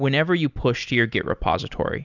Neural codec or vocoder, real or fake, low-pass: none; real; 7.2 kHz